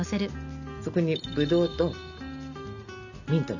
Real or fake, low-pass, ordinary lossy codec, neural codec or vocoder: real; 7.2 kHz; none; none